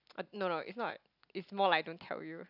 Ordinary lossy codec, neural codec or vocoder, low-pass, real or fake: none; none; 5.4 kHz; real